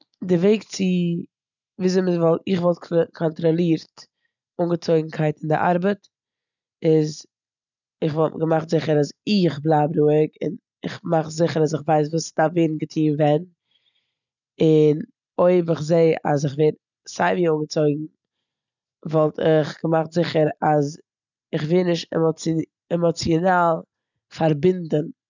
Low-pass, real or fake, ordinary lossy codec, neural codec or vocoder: 7.2 kHz; real; none; none